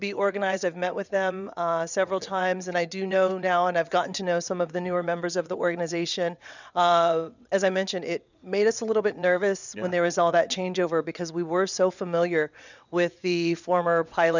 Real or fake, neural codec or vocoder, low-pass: fake; vocoder, 22.05 kHz, 80 mel bands, WaveNeXt; 7.2 kHz